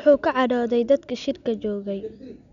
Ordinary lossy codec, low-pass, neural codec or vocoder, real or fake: none; 7.2 kHz; none; real